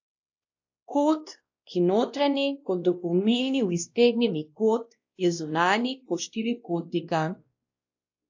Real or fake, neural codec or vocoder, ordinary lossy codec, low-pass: fake; codec, 16 kHz, 1 kbps, X-Codec, WavLM features, trained on Multilingual LibriSpeech; AAC, 48 kbps; 7.2 kHz